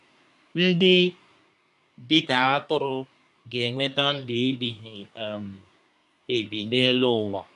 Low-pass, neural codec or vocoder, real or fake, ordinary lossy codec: 10.8 kHz; codec, 24 kHz, 1 kbps, SNAC; fake; none